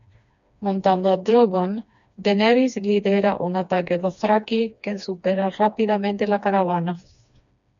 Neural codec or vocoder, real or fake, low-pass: codec, 16 kHz, 2 kbps, FreqCodec, smaller model; fake; 7.2 kHz